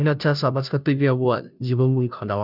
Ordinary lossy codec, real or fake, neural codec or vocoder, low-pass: none; fake; codec, 16 kHz, 0.5 kbps, FunCodec, trained on Chinese and English, 25 frames a second; 5.4 kHz